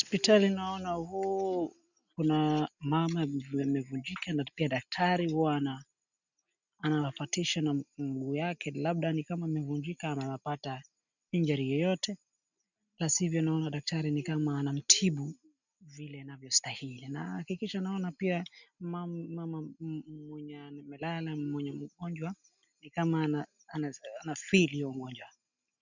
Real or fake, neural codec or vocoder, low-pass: real; none; 7.2 kHz